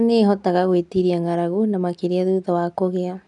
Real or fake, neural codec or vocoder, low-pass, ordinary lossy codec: real; none; 10.8 kHz; none